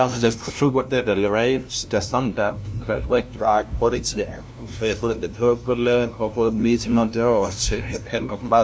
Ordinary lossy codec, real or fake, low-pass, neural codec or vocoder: none; fake; none; codec, 16 kHz, 0.5 kbps, FunCodec, trained on LibriTTS, 25 frames a second